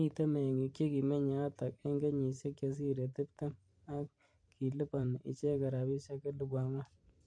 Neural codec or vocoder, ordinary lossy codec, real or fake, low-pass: vocoder, 44.1 kHz, 128 mel bands every 512 samples, BigVGAN v2; MP3, 48 kbps; fake; 9.9 kHz